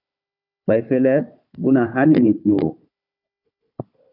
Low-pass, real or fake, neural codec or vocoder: 5.4 kHz; fake; codec, 16 kHz, 4 kbps, FunCodec, trained on Chinese and English, 50 frames a second